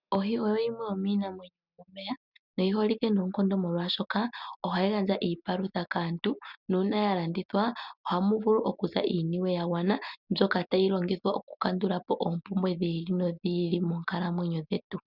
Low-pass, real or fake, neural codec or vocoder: 5.4 kHz; real; none